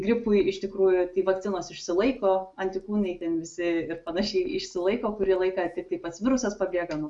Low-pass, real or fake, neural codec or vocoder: 10.8 kHz; real; none